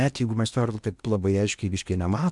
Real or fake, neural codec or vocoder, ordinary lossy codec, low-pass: fake; codec, 16 kHz in and 24 kHz out, 0.8 kbps, FocalCodec, streaming, 65536 codes; MP3, 96 kbps; 10.8 kHz